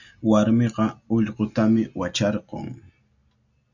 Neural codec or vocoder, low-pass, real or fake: none; 7.2 kHz; real